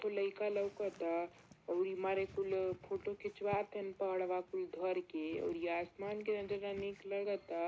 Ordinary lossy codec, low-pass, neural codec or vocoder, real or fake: none; none; none; real